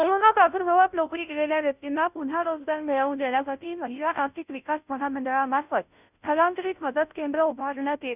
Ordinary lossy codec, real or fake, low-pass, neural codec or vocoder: none; fake; 3.6 kHz; codec, 16 kHz, 0.5 kbps, FunCodec, trained on Chinese and English, 25 frames a second